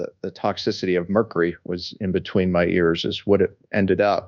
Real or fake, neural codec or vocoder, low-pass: fake; codec, 24 kHz, 1.2 kbps, DualCodec; 7.2 kHz